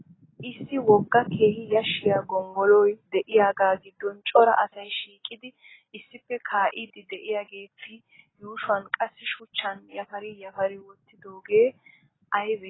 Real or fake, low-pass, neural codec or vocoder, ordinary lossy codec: real; 7.2 kHz; none; AAC, 16 kbps